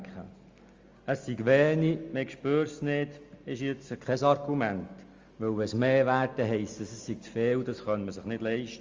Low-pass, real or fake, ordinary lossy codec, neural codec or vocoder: 7.2 kHz; real; Opus, 32 kbps; none